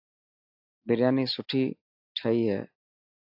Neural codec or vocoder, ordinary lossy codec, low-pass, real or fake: none; AAC, 48 kbps; 5.4 kHz; real